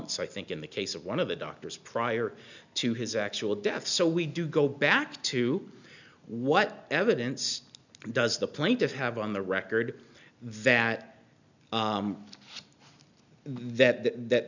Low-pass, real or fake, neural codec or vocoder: 7.2 kHz; real; none